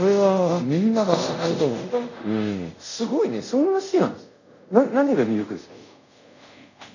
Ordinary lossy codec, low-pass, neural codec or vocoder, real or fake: none; 7.2 kHz; codec, 24 kHz, 0.5 kbps, DualCodec; fake